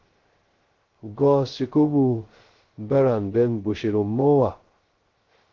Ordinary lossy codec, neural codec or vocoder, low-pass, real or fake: Opus, 16 kbps; codec, 16 kHz, 0.2 kbps, FocalCodec; 7.2 kHz; fake